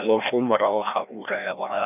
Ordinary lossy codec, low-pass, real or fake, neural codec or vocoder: none; 3.6 kHz; fake; codec, 16 kHz, 1 kbps, FreqCodec, larger model